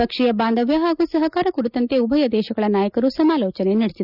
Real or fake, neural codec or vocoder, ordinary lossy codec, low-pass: real; none; none; 5.4 kHz